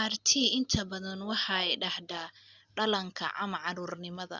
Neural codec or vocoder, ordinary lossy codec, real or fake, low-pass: none; Opus, 64 kbps; real; 7.2 kHz